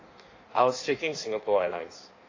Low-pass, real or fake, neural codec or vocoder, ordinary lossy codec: 7.2 kHz; fake; codec, 16 kHz in and 24 kHz out, 1.1 kbps, FireRedTTS-2 codec; AAC, 32 kbps